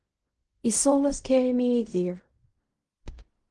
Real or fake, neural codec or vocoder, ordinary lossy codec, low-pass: fake; codec, 16 kHz in and 24 kHz out, 0.4 kbps, LongCat-Audio-Codec, fine tuned four codebook decoder; Opus, 24 kbps; 10.8 kHz